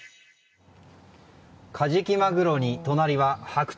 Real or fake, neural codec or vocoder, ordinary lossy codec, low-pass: real; none; none; none